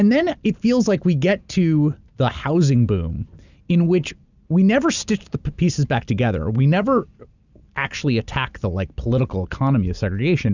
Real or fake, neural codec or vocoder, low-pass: real; none; 7.2 kHz